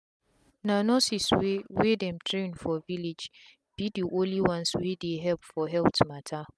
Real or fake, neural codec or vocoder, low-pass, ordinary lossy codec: real; none; none; none